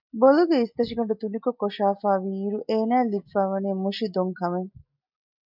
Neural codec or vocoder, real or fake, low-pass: none; real; 5.4 kHz